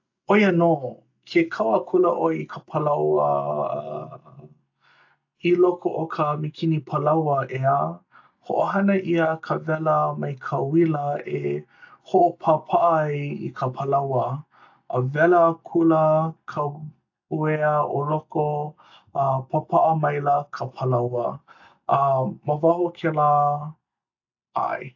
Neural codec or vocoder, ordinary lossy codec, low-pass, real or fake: none; AAC, 48 kbps; 7.2 kHz; real